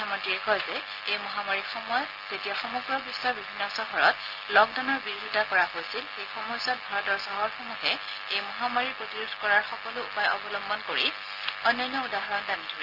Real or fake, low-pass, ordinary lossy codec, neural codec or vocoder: real; 5.4 kHz; Opus, 32 kbps; none